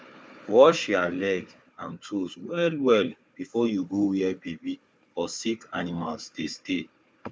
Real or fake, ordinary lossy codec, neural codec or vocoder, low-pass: fake; none; codec, 16 kHz, 4 kbps, FunCodec, trained on Chinese and English, 50 frames a second; none